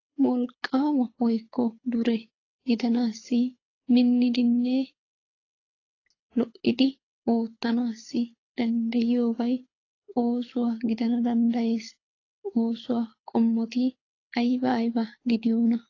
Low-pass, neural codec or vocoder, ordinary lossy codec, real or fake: 7.2 kHz; codec, 24 kHz, 6 kbps, HILCodec; AAC, 32 kbps; fake